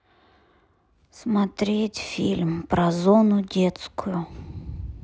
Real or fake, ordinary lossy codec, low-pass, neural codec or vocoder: real; none; none; none